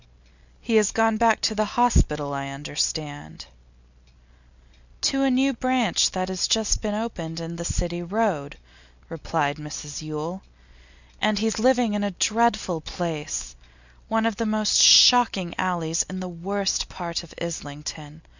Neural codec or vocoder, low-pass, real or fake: none; 7.2 kHz; real